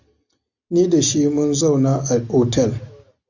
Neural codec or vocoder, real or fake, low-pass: none; real; 7.2 kHz